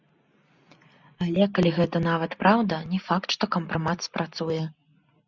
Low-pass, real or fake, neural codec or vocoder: 7.2 kHz; real; none